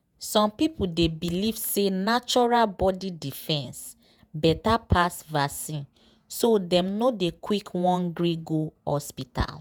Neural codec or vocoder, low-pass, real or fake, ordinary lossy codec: vocoder, 48 kHz, 128 mel bands, Vocos; none; fake; none